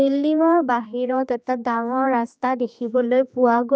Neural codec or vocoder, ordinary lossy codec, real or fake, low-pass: codec, 16 kHz, 2 kbps, X-Codec, HuBERT features, trained on general audio; none; fake; none